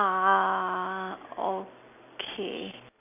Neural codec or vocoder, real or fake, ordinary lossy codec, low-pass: none; real; none; 3.6 kHz